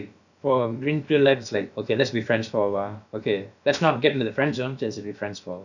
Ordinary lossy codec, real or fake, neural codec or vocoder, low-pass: none; fake; codec, 16 kHz, about 1 kbps, DyCAST, with the encoder's durations; 7.2 kHz